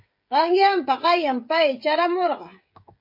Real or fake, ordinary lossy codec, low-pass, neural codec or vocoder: fake; MP3, 32 kbps; 5.4 kHz; codec, 16 kHz, 16 kbps, FreqCodec, smaller model